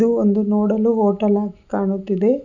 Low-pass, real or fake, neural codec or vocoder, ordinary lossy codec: 7.2 kHz; real; none; none